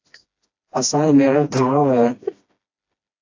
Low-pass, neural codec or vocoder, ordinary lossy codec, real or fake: 7.2 kHz; codec, 16 kHz, 1 kbps, FreqCodec, smaller model; AAC, 48 kbps; fake